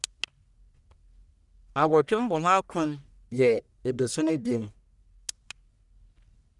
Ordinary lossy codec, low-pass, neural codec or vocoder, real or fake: none; 10.8 kHz; codec, 44.1 kHz, 1.7 kbps, Pupu-Codec; fake